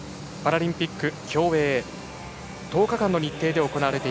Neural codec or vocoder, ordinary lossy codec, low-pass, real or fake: none; none; none; real